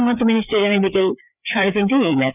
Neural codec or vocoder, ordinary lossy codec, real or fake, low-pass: codec, 16 kHz, 4 kbps, FreqCodec, larger model; none; fake; 3.6 kHz